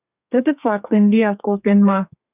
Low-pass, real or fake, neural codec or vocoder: 3.6 kHz; fake; codec, 32 kHz, 1.9 kbps, SNAC